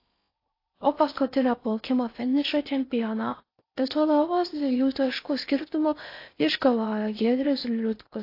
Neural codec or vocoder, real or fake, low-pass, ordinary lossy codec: codec, 16 kHz in and 24 kHz out, 0.6 kbps, FocalCodec, streaming, 4096 codes; fake; 5.4 kHz; AAC, 32 kbps